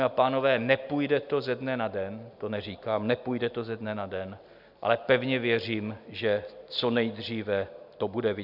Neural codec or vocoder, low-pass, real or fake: none; 5.4 kHz; real